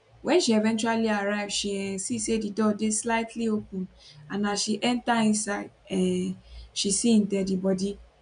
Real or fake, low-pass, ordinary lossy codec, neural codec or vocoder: real; 9.9 kHz; none; none